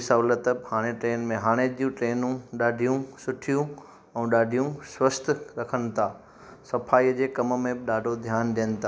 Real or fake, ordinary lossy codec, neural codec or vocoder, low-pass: real; none; none; none